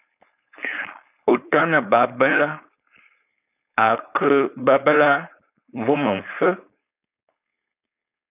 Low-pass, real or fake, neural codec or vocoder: 3.6 kHz; fake; codec, 16 kHz, 4.8 kbps, FACodec